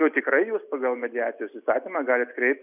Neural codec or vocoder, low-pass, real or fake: none; 3.6 kHz; real